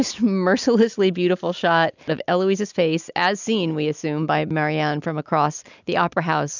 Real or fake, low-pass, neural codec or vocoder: real; 7.2 kHz; none